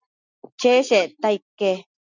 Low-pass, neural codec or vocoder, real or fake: 7.2 kHz; none; real